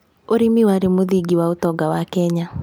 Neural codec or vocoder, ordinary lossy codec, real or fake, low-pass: none; none; real; none